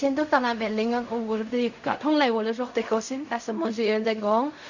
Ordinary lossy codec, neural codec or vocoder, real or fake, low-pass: none; codec, 16 kHz in and 24 kHz out, 0.4 kbps, LongCat-Audio-Codec, fine tuned four codebook decoder; fake; 7.2 kHz